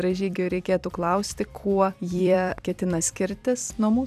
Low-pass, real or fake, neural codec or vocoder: 14.4 kHz; fake; vocoder, 44.1 kHz, 128 mel bands every 256 samples, BigVGAN v2